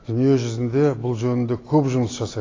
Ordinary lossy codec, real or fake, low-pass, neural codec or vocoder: AAC, 32 kbps; real; 7.2 kHz; none